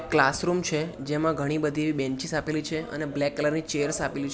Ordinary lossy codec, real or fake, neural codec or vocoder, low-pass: none; real; none; none